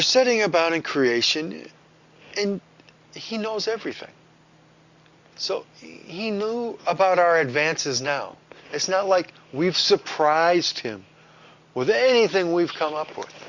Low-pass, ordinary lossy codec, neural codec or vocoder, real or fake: 7.2 kHz; Opus, 64 kbps; none; real